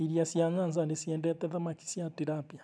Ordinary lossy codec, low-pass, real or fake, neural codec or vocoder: none; none; real; none